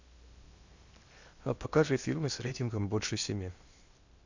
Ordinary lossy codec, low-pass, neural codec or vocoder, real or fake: Opus, 64 kbps; 7.2 kHz; codec, 16 kHz in and 24 kHz out, 0.8 kbps, FocalCodec, streaming, 65536 codes; fake